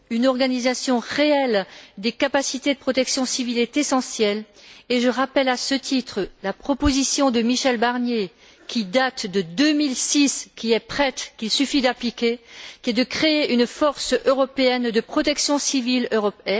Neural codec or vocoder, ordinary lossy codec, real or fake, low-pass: none; none; real; none